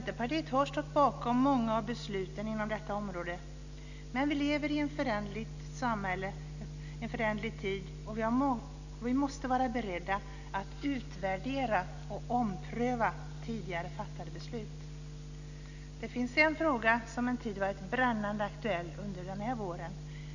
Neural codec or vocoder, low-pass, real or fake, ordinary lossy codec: none; 7.2 kHz; real; none